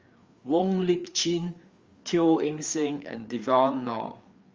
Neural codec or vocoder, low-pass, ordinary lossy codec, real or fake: codec, 16 kHz, 4 kbps, FreqCodec, larger model; 7.2 kHz; Opus, 32 kbps; fake